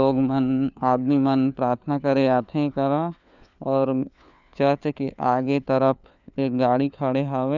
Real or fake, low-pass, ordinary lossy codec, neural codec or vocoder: fake; 7.2 kHz; none; codec, 16 kHz, 4 kbps, FunCodec, trained on LibriTTS, 50 frames a second